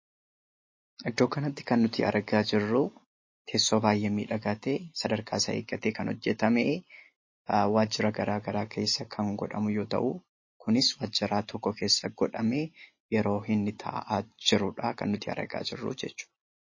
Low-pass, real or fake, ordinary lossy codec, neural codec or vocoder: 7.2 kHz; real; MP3, 32 kbps; none